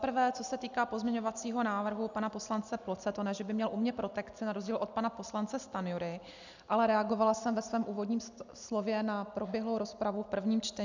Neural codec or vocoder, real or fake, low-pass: none; real; 7.2 kHz